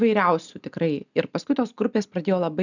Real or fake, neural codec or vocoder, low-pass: real; none; 7.2 kHz